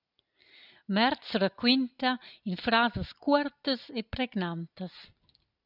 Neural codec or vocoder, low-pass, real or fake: none; 5.4 kHz; real